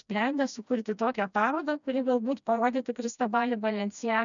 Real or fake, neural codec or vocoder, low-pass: fake; codec, 16 kHz, 1 kbps, FreqCodec, smaller model; 7.2 kHz